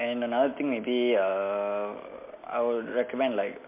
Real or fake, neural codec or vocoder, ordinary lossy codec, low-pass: real; none; MP3, 24 kbps; 3.6 kHz